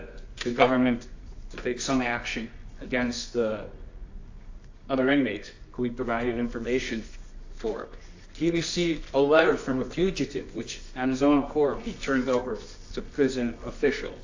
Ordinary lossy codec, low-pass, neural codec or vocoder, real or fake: AAC, 48 kbps; 7.2 kHz; codec, 24 kHz, 0.9 kbps, WavTokenizer, medium music audio release; fake